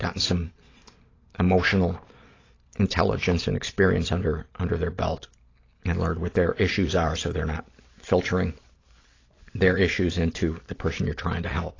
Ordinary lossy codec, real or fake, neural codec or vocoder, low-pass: AAC, 32 kbps; real; none; 7.2 kHz